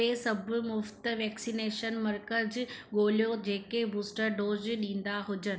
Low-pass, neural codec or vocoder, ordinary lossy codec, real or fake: none; none; none; real